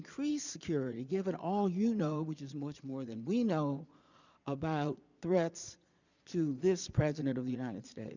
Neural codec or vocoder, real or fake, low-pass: vocoder, 22.05 kHz, 80 mel bands, Vocos; fake; 7.2 kHz